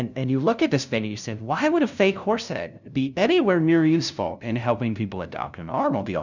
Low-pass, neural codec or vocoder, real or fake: 7.2 kHz; codec, 16 kHz, 0.5 kbps, FunCodec, trained on LibriTTS, 25 frames a second; fake